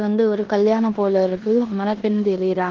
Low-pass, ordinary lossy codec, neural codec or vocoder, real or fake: 7.2 kHz; Opus, 16 kbps; codec, 16 kHz in and 24 kHz out, 0.9 kbps, LongCat-Audio-Codec, four codebook decoder; fake